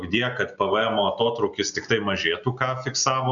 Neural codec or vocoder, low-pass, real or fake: none; 7.2 kHz; real